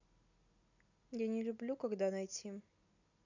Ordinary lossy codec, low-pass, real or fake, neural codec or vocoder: none; 7.2 kHz; real; none